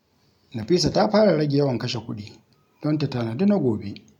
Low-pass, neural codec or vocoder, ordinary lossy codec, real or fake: 19.8 kHz; none; none; real